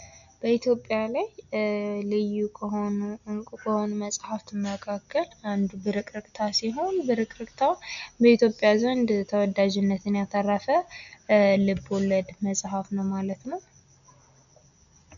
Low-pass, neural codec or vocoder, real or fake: 7.2 kHz; none; real